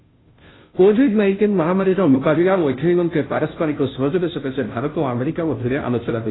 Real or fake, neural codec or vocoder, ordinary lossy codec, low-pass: fake; codec, 16 kHz, 0.5 kbps, FunCodec, trained on Chinese and English, 25 frames a second; AAC, 16 kbps; 7.2 kHz